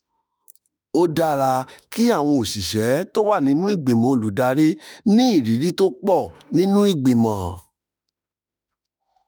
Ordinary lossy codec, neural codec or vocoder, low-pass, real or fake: none; autoencoder, 48 kHz, 32 numbers a frame, DAC-VAE, trained on Japanese speech; none; fake